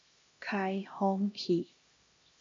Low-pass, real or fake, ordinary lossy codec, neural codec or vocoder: 7.2 kHz; fake; MP3, 48 kbps; codec, 16 kHz, 1 kbps, X-Codec, HuBERT features, trained on LibriSpeech